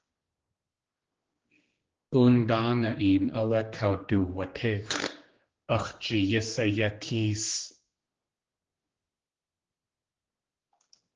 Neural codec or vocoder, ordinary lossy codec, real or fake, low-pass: codec, 16 kHz, 2 kbps, X-Codec, HuBERT features, trained on general audio; Opus, 16 kbps; fake; 7.2 kHz